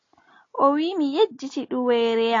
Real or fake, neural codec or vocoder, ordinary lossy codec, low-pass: real; none; AAC, 32 kbps; 7.2 kHz